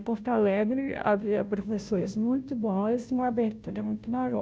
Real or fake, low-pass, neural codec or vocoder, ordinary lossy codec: fake; none; codec, 16 kHz, 0.5 kbps, FunCodec, trained on Chinese and English, 25 frames a second; none